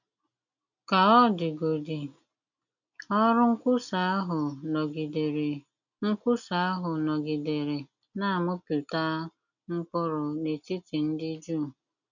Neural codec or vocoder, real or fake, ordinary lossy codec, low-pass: none; real; none; 7.2 kHz